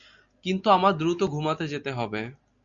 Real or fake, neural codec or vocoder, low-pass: real; none; 7.2 kHz